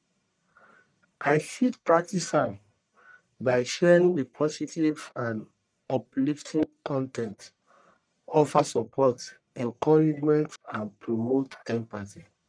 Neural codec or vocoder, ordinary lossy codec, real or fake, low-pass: codec, 44.1 kHz, 1.7 kbps, Pupu-Codec; none; fake; 9.9 kHz